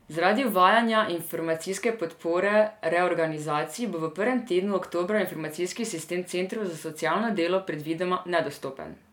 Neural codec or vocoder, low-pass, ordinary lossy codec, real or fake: none; 19.8 kHz; none; real